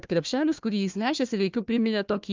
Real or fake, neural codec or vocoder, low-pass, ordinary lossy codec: fake; codec, 16 kHz, 1 kbps, FunCodec, trained on Chinese and English, 50 frames a second; 7.2 kHz; Opus, 24 kbps